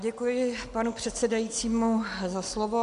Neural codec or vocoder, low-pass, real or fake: none; 10.8 kHz; real